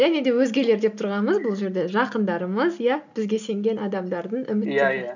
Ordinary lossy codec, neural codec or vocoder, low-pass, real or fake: none; none; 7.2 kHz; real